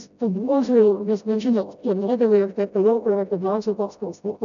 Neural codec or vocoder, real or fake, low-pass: codec, 16 kHz, 0.5 kbps, FreqCodec, smaller model; fake; 7.2 kHz